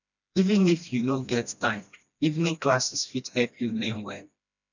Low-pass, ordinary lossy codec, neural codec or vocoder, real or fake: 7.2 kHz; none; codec, 16 kHz, 1 kbps, FreqCodec, smaller model; fake